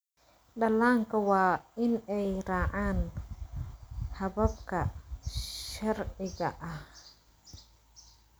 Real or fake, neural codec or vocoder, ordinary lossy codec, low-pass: real; none; none; none